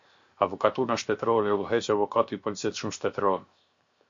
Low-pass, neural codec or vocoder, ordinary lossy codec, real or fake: 7.2 kHz; codec, 16 kHz, 0.7 kbps, FocalCodec; MP3, 48 kbps; fake